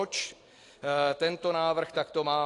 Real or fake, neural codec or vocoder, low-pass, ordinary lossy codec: real; none; 10.8 kHz; AAC, 48 kbps